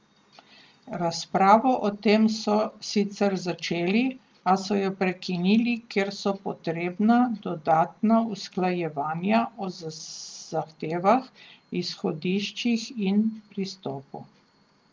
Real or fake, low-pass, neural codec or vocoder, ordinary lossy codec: real; 7.2 kHz; none; Opus, 32 kbps